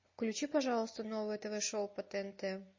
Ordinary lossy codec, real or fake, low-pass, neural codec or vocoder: MP3, 32 kbps; real; 7.2 kHz; none